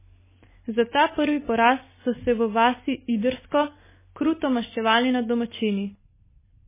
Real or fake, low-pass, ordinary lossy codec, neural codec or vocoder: real; 3.6 kHz; MP3, 16 kbps; none